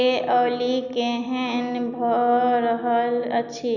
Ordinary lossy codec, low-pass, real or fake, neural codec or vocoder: none; 7.2 kHz; real; none